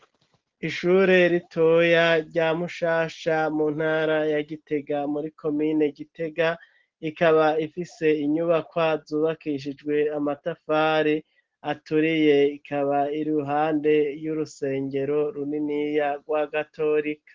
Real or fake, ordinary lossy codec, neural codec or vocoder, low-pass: real; Opus, 16 kbps; none; 7.2 kHz